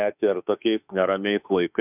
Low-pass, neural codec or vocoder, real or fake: 3.6 kHz; autoencoder, 48 kHz, 32 numbers a frame, DAC-VAE, trained on Japanese speech; fake